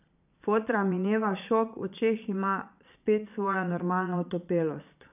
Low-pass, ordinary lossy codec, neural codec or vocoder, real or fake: 3.6 kHz; none; vocoder, 22.05 kHz, 80 mel bands, Vocos; fake